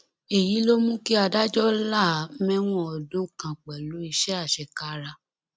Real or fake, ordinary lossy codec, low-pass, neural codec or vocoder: real; none; none; none